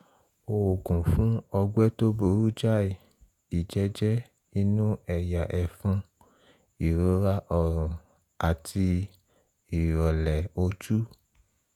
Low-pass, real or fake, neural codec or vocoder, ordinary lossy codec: 19.8 kHz; fake; vocoder, 48 kHz, 128 mel bands, Vocos; none